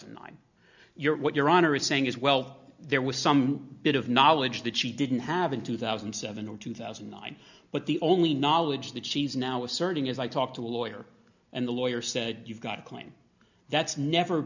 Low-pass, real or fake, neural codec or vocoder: 7.2 kHz; real; none